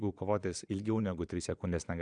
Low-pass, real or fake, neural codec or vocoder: 9.9 kHz; fake; vocoder, 22.05 kHz, 80 mel bands, Vocos